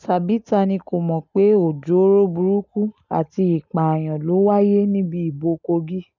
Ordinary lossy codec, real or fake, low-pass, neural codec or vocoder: none; real; 7.2 kHz; none